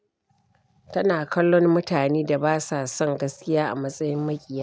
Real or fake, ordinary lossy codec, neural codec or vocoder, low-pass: real; none; none; none